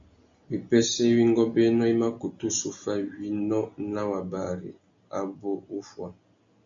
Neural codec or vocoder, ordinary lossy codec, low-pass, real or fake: none; AAC, 64 kbps; 7.2 kHz; real